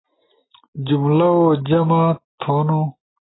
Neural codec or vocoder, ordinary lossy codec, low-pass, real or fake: none; AAC, 16 kbps; 7.2 kHz; real